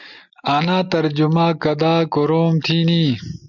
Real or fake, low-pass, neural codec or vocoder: real; 7.2 kHz; none